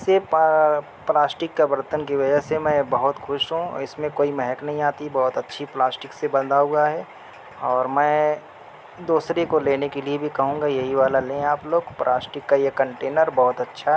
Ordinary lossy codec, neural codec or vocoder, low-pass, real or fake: none; none; none; real